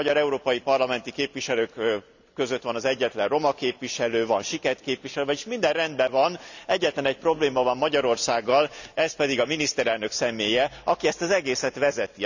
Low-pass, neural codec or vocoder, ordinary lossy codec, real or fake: 7.2 kHz; none; none; real